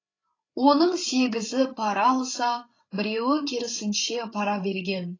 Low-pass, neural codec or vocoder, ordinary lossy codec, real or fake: 7.2 kHz; codec, 16 kHz, 8 kbps, FreqCodec, larger model; AAC, 32 kbps; fake